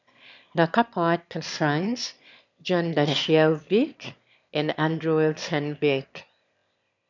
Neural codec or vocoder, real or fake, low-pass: autoencoder, 22.05 kHz, a latent of 192 numbers a frame, VITS, trained on one speaker; fake; 7.2 kHz